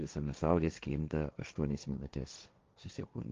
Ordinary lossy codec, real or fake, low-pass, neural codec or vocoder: Opus, 32 kbps; fake; 7.2 kHz; codec, 16 kHz, 1.1 kbps, Voila-Tokenizer